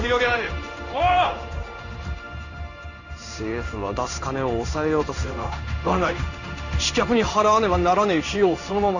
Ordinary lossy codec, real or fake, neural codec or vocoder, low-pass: none; fake; codec, 16 kHz in and 24 kHz out, 1 kbps, XY-Tokenizer; 7.2 kHz